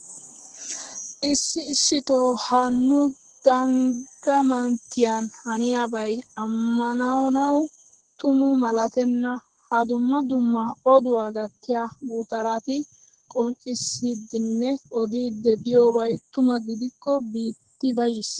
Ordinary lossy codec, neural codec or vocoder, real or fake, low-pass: Opus, 16 kbps; codec, 32 kHz, 1.9 kbps, SNAC; fake; 9.9 kHz